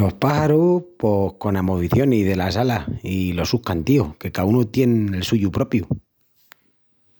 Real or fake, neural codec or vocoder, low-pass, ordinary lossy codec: real; none; none; none